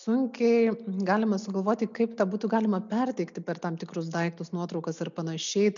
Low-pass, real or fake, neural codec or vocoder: 7.2 kHz; real; none